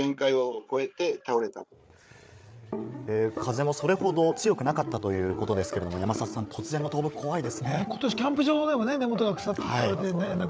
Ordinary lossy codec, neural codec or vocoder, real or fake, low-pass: none; codec, 16 kHz, 8 kbps, FreqCodec, larger model; fake; none